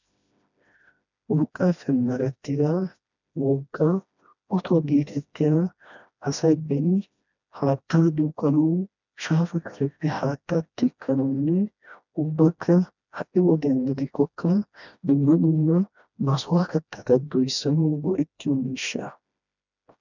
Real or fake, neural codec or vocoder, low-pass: fake; codec, 16 kHz, 1 kbps, FreqCodec, smaller model; 7.2 kHz